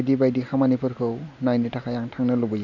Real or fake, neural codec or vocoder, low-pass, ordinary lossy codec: real; none; 7.2 kHz; none